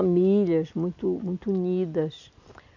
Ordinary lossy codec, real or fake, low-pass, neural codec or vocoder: none; real; 7.2 kHz; none